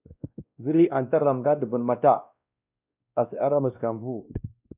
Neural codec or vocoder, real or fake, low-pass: codec, 16 kHz, 1 kbps, X-Codec, WavLM features, trained on Multilingual LibriSpeech; fake; 3.6 kHz